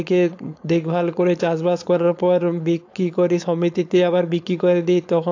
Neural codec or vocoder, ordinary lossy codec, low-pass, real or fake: codec, 16 kHz, 4.8 kbps, FACodec; AAC, 48 kbps; 7.2 kHz; fake